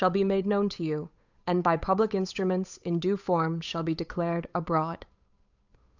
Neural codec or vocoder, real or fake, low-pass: codec, 16 kHz, 8 kbps, FunCodec, trained on Chinese and English, 25 frames a second; fake; 7.2 kHz